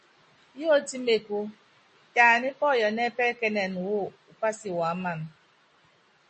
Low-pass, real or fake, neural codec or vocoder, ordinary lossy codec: 10.8 kHz; real; none; MP3, 32 kbps